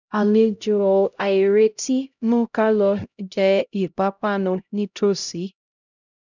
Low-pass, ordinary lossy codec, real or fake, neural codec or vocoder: 7.2 kHz; none; fake; codec, 16 kHz, 0.5 kbps, X-Codec, HuBERT features, trained on LibriSpeech